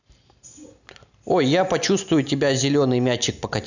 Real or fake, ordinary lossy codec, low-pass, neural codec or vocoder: real; none; 7.2 kHz; none